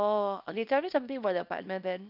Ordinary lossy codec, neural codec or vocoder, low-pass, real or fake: none; codec, 24 kHz, 0.9 kbps, WavTokenizer, small release; 5.4 kHz; fake